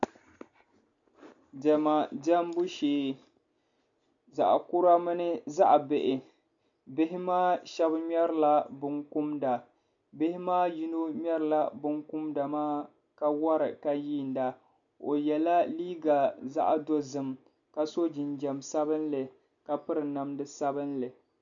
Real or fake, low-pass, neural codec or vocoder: real; 7.2 kHz; none